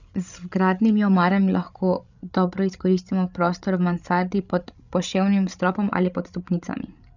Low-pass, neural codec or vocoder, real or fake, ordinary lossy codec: 7.2 kHz; codec, 16 kHz, 16 kbps, FreqCodec, larger model; fake; none